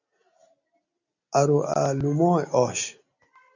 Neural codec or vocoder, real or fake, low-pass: none; real; 7.2 kHz